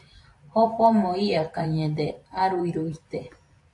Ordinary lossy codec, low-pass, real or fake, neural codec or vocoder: AAC, 48 kbps; 10.8 kHz; fake; vocoder, 44.1 kHz, 128 mel bands every 512 samples, BigVGAN v2